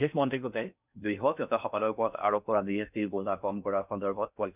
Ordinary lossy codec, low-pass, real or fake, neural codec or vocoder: none; 3.6 kHz; fake; codec, 16 kHz in and 24 kHz out, 0.6 kbps, FocalCodec, streaming, 4096 codes